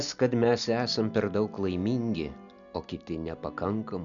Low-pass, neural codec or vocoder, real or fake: 7.2 kHz; none; real